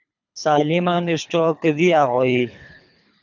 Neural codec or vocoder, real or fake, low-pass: codec, 24 kHz, 3 kbps, HILCodec; fake; 7.2 kHz